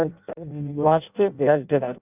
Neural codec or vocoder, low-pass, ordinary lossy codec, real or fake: codec, 16 kHz in and 24 kHz out, 0.6 kbps, FireRedTTS-2 codec; 3.6 kHz; none; fake